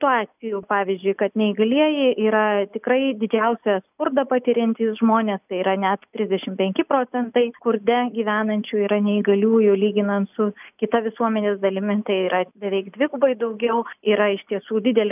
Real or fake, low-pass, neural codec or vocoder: real; 3.6 kHz; none